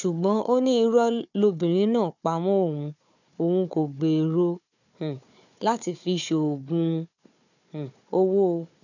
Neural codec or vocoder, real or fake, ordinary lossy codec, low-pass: codec, 16 kHz, 4 kbps, FunCodec, trained on Chinese and English, 50 frames a second; fake; none; 7.2 kHz